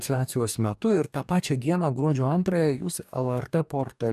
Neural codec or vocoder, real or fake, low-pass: codec, 44.1 kHz, 2.6 kbps, DAC; fake; 14.4 kHz